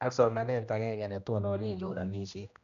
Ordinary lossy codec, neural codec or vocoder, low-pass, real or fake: none; codec, 16 kHz, 1 kbps, X-Codec, HuBERT features, trained on general audio; 7.2 kHz; fake